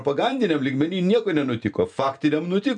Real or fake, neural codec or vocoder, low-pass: real; none; 9.9 kHz